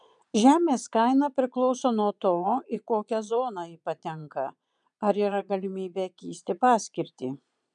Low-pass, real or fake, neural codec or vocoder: 9.9 kHz; fake; vocoder, 22.05 kHz, 80 mel bands, Vocos